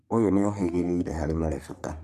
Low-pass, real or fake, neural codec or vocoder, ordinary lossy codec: 14.4 kHz; fake; codec, 44.1 kHz, 3.4 kbps, Pupu-Codec; none